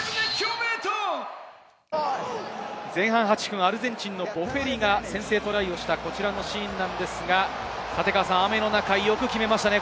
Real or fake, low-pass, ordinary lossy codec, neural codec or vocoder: real; none; none; none